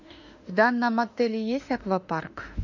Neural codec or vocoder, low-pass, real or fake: autoencoder, 48 kHz, 32 numbers a frame, DAC-VAE, trained on Japanese speech; 7.2 kHz; fake